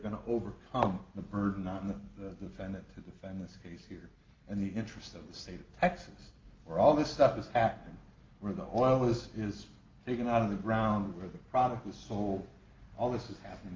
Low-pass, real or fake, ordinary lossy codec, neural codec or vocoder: 7.2 kHz; real; Opus, 32 kbps; none